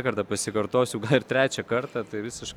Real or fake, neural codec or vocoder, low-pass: real; none; 19.8 kHz